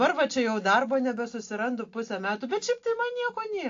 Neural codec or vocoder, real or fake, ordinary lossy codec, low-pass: none; real; AAC, 48 kbps; 7.2 kHz